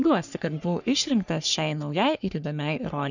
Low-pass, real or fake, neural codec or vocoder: 7.2 kHz; fake; codec, 44.1 kHz, 3.4 kbps, Pupu-Codec